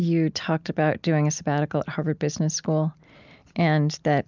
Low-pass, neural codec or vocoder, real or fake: 7.2 kHz; none; real